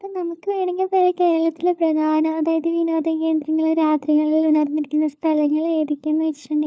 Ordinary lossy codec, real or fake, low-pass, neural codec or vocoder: none; fake; none; codec, 16 kHz, 4 kbps, FunCodec, trained on LibriTTS, 50 frames a second